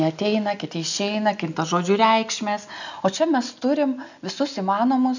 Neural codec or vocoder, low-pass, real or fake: none; 7.2 kHz; real